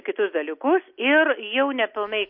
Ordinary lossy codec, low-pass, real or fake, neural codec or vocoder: MP3, 32 kbps; 5.4 kHz; real; none